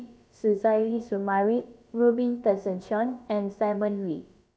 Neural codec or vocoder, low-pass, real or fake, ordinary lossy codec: codec, 16 kHz, about 1 kbps, DyCAST, with the encoder's durations; none; fake; none